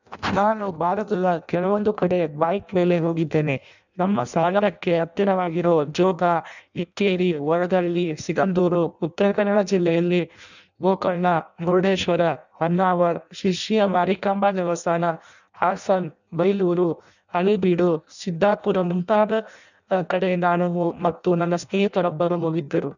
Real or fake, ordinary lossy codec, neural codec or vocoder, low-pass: fake; none; codec, 16 kHz in and 24 kHz out, 0.6 kbps, FireRedTTS-2 codec; 7.2 kHz